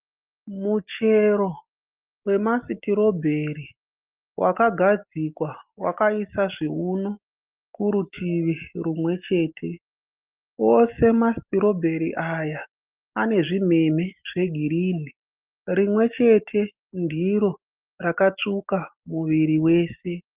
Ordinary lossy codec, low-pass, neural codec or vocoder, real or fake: Opus, 24 kbps; 3.6 kHz; none; real